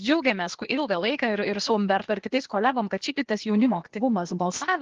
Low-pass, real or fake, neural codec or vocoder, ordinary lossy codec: 7.2 kHz; fake; codec, 16 kHz, 0.8 kbps, ZipCodec; Opus, 16 kbps